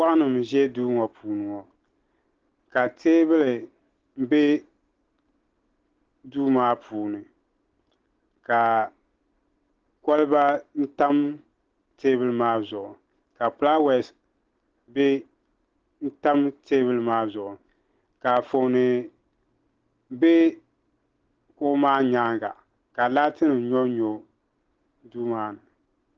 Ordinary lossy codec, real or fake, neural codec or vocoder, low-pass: Opus, 16 kbps; real; none; 7.2 kHz